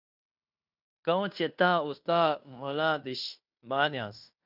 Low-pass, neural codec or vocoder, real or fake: 5.4 kHz; codec, 16 kHz in and 24 kHz out, 0.9 kbps, LongCat-Audio-Codec, fine tuned four codebook decoder; fake